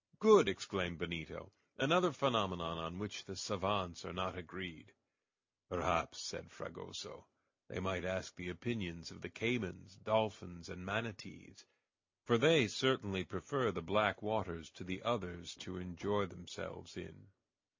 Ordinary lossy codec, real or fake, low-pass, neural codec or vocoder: MP3, 48 kbps; real; 7.2 kHz; none